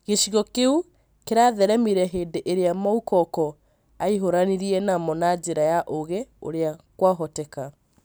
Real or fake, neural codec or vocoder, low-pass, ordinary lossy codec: real; none; none; none